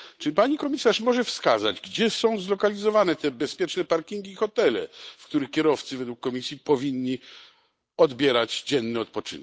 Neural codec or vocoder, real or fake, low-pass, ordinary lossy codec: codec, 16 kHz, 8 kbps, FunCodec, trained on Chinese and English, 25 frames a second; fake; none; none